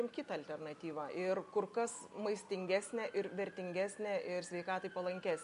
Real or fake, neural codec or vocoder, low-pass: real; none; 10.8 kHz